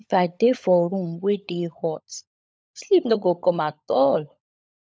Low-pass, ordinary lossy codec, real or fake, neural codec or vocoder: none; none; fake; codec, 16 kHz, 16 kbps, FunCodec, trained on LibriTTS, 50 frames a second